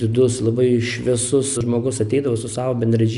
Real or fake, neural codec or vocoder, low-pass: real; none; 10.8 kHz